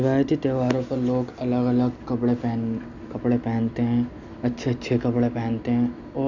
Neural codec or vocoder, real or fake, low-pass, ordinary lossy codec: none; real; 7.2 kHz; none